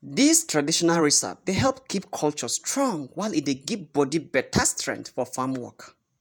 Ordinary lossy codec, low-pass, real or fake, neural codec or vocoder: none; none; fake; vocoder, 48 kHz, 128 mel bands, Vocos